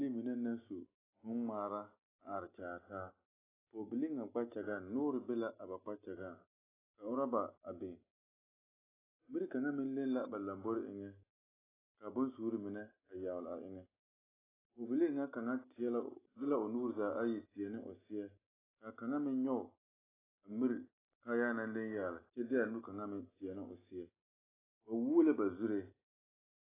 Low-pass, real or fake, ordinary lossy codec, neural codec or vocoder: 3.6 kHz; real; AAC, 16 kbps; none